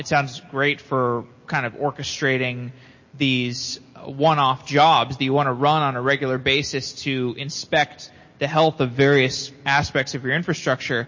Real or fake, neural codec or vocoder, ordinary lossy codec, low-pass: real; none; MP3, 32 kbps; 7.2 kHz